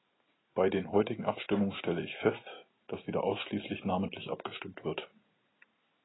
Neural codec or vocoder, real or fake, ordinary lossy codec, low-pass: none; real; AAC, 16 kbps; 7.2 kHz